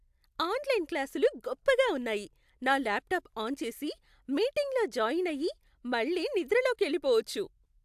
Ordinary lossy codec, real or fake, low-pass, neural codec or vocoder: AAC, 96 kbps; real; 14.4 kHz; none